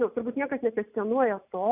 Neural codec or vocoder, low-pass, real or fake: none; 3.6 kHz; real